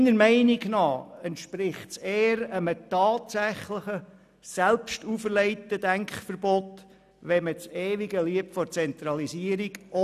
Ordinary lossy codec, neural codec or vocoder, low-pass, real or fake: none; none; 14.4 kHz; real